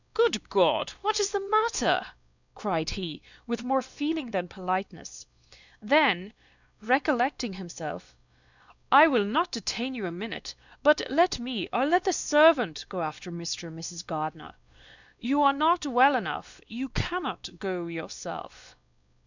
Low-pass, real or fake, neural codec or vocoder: 7.2 kHz; fake; codec, 16 kHz, 2 kbps, X-Codec, WavLM features, trained on Multilingual LibriSpeech